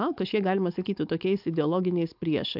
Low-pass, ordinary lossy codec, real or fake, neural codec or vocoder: 5.4 kHz; AAC, 48 kbps; fake; codec, 16 kHz, 4.8 kbps, FACodec